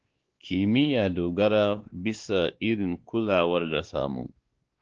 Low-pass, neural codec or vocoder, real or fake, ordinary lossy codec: 7.2 kHz; codec, 16 kHz, 2 kbps, X-Codec, WavLM features, trained on Multilingual LibriSpeech; fake; Opus, 16 kbps